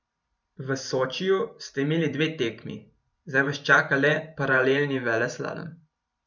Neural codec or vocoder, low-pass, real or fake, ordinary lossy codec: vocoder, 44.1 kHz, 128 mel bands every 512 samples, BigVGAN v2; 7.2 kHz; fake; none